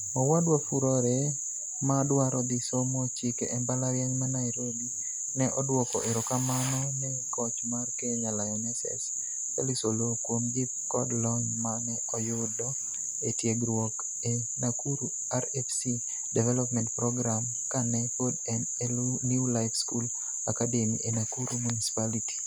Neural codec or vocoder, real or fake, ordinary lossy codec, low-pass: none; real; none; none